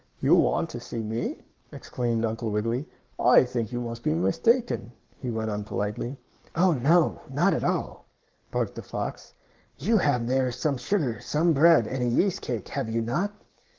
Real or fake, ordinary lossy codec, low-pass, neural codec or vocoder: fake; Opus, 24 kbps; 7.2 kHz; codec, 44.1 kHz, 7.8 kbps, Pupu-Codec